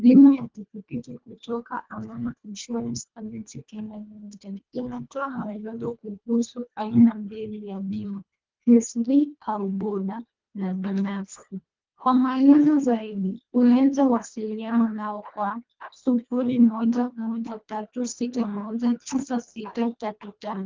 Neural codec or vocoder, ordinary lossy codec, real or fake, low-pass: codec, 24 kHz, 1.5 kbps, HILCodec; Opus, 32 kbps; fake; 7.2 kHz